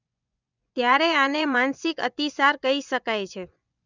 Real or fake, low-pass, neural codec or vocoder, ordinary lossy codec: fake; 7.2 kHz; vocoder, 24 kHz, 100 mel bands, Vocos; none